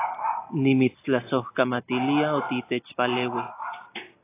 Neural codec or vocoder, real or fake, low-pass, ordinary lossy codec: none; real; 3.6 kHz; AAC, 24 kbps